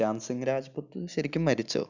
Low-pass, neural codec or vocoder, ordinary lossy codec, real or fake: 7.2 kHz; none; none; real